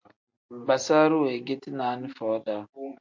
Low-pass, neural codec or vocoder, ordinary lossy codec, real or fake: 7.2 kHz; none; MP3, 48 kbps; real